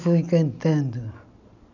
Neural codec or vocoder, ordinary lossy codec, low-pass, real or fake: none; none; 7.2 kHz; real